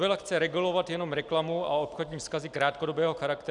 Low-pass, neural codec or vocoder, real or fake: 10.8 kHz; none; real